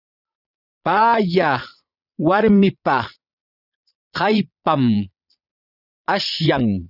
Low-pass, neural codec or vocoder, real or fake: 5.4 kHz; vocoder, 22.05 kHz, 80 mel bands, Vocos; fake